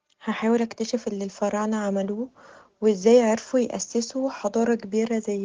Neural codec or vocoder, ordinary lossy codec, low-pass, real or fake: none; Opus, 16 kbps; 7.2 kHz; real